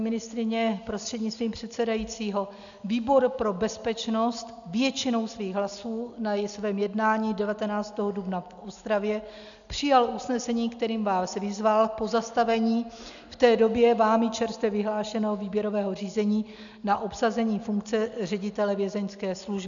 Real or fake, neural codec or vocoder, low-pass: real; none; 7.2 kHz